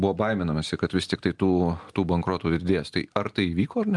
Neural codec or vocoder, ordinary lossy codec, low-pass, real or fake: none; Opus, 32 kbps; 10.8 kHz; real